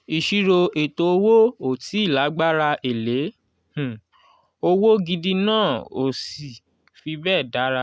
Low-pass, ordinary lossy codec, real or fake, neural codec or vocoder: none; none; real; none